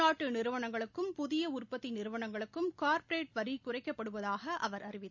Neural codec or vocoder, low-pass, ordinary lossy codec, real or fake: none; 7.2 kHz; none; real